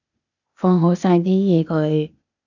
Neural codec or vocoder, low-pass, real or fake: codec, 16 kHz, 0.8 kbps, ZipCodec; 7.2 kHz; fake